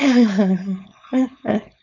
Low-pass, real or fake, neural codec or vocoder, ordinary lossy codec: 7.2 kHz; fake; codec, 16 kHz, 4.8 kbps, FACodec; none